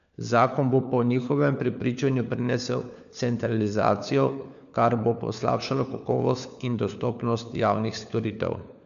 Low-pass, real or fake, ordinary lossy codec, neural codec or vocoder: 7.2 kHz; fake; none; codec, 16 kHz, 4 kbps, FunCodec, trained on LibriTTS, 50 frames a second